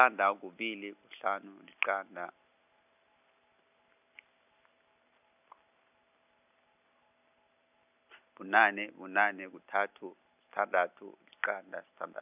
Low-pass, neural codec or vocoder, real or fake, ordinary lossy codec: 3.6 kHz; none; real; none